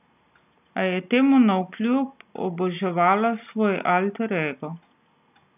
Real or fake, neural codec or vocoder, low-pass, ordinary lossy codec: real; none; 3.6 kHz; none